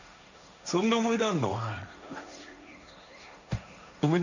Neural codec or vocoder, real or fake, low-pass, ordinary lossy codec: codec, 16 kHz, 1.1 kbps, Voila-Tokenizer; fake; 7.2 kHz; none